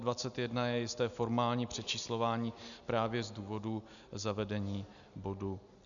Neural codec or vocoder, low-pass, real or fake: none; 7.2 kHz; real